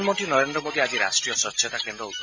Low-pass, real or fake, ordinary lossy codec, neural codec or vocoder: 7.2 kHz; real; MP3, 48 kbps; none